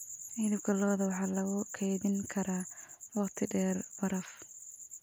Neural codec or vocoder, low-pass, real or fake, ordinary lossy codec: none; none; real; none